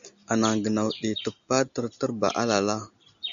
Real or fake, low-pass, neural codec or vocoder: real; 7.2 kHz; none